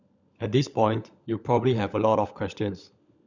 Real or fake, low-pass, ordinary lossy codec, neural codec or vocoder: fake; 7.2 kHz; none; codec, 16 kHz, 16 kbps, FunCodec, trained on LibriTTS, 50 frames a second